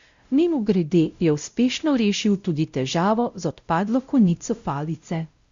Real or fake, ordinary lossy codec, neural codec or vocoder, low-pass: fake; Opus, 64 kbps; codec, 16 kHz, 0.5 kbps, X-Codec, WavLM features, trained on Multilingual LibriSpeech; 7.2 kHz